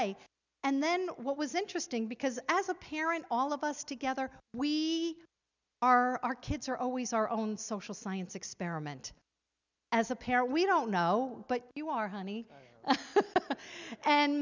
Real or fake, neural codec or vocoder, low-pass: real; none; 7.2 kHz